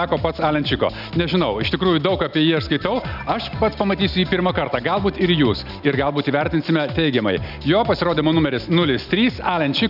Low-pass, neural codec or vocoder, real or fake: 5.4 kHz; none; real